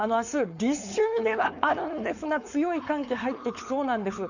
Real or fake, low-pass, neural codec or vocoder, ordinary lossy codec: fake; 7.2 kHz; codec, 16 kHz, 4.8 kbps, FACodec; none